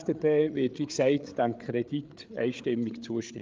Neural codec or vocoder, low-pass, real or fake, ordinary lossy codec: codec, 16 kHz, 8 kbps, FreqCodec, larger model; 7.2 kHz; fake; Opus, 32 kbps